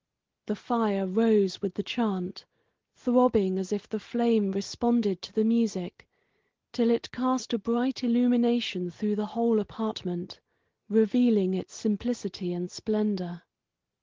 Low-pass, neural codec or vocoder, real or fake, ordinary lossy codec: 7.2 kHz; none; real; Opus, 16 kbps